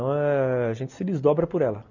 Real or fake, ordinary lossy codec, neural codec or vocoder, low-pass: real; none; none; 7.2 kHz